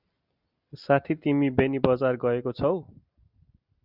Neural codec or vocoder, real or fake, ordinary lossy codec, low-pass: none; real; Opus, 64 kbps; 5.4 kHz